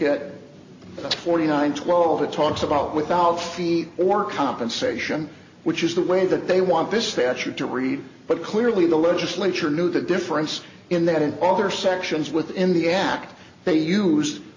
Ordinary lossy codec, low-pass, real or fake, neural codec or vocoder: MP3, 32 kbps; 7.2 kHz; real; none